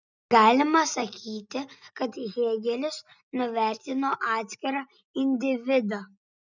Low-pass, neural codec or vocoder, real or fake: 7.2 kHz; none; real